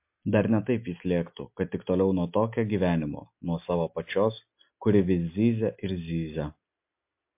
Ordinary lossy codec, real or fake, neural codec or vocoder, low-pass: MP3, 32 kbps; real; none; 3.6 kHz